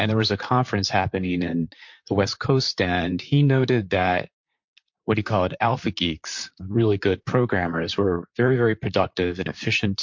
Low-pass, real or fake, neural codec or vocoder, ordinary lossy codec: 7.2 kHz; fake; vocoder, 44.1 kHz, 128 mel bands, Pupu-Vocoder; MP3, 48 kbps